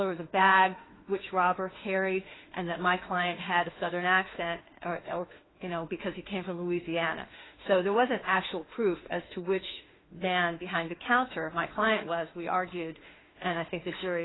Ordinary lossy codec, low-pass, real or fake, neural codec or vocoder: AAC, 16 kbps; 7.2 kHz; fake; autoencoder, 48 kHz, 32 numbers a frame, DAC-VAE, trained on Japanese speech